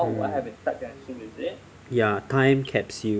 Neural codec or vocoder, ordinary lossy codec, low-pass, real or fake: none; none; none; real